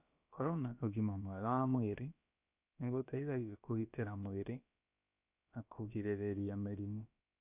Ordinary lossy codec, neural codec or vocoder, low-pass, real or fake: none; codec, 16 kHz, about 1 kbps, DyCAST, with the encoder's durations; 3.6 kHz; fake